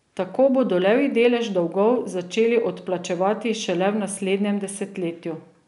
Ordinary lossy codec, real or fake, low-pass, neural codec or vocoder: none; real; 10.8 kHz; none